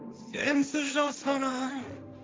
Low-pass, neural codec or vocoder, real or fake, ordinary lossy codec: none; codec, 16 kHz, 1.1 kbps, Voila-Tokenizer; fake; none